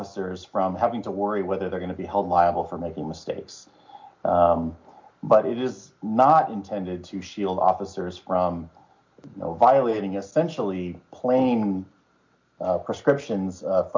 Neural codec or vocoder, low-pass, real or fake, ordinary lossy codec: none; 7.2 kHz; real; MP3, 48 kbps